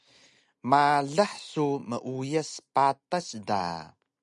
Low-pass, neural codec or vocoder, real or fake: 10.8 kHz; none; real